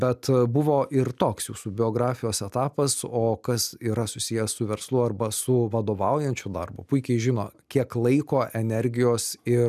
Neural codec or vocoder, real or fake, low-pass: none; real; 14.4 kHz